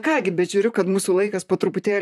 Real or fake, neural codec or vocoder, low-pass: fake; vocoder, 44.1 kHz, 128 mel bands, Pupu-Vocoder; 14.4 kHz